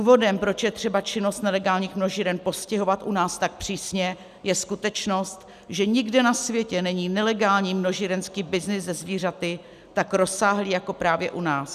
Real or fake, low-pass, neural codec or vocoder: real; 14.4 kHz; none